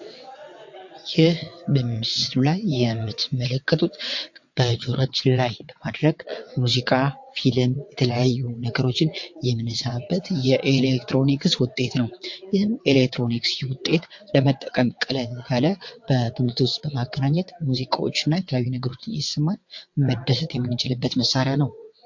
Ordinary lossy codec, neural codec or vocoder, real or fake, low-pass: MP3, 48 kbps; vocoder, 22.05 kHz, 80 mel bands, WaveNeXt; fake; 7.2 kHz